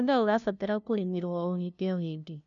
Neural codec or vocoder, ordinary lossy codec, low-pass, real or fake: codec, 16 kHz, 0.5 kbps, FunCodec, trained on LibriTTS, 25 frames a second; none; 7.2 kHz; fake